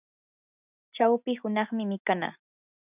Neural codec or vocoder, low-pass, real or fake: none; 3.6 kHz; real